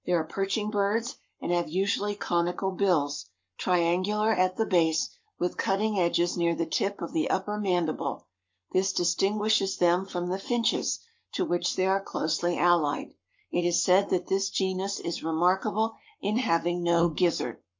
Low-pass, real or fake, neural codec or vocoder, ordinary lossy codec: 7.2 kHz; fake; codec, 44.1 kHz, 7.8 kbps, Pupu-Codec; MP3, 48 kbps